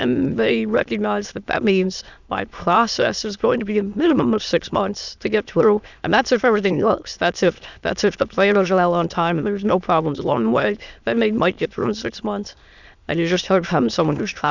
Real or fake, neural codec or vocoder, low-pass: fake; autoencoder, 22.05 kHz, a latent of 192 numbers a frame, VITS, trained on many speakers; 7.2 kHz